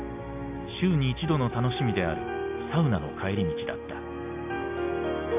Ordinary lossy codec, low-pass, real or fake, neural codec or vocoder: AAC, 32 kbps; 3.6 kHz; real; none